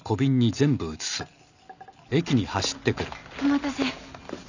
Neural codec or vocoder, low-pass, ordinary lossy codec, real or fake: none; 7.2 kHz; none; real